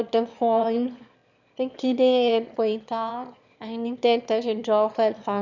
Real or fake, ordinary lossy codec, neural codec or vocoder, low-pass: fake; none; autoencoder, 22.05 kHz, a latent of 192 numbers a frame, VITS, trained on one speaker; 7.2 kHz